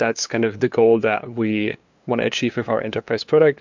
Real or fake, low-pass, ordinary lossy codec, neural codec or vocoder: fake; 7.2 kHz; MP3, 64 kbps; codec, 16 kHz, 2 kbps, FunCodec, trained on LibriTTS, 25 frames a second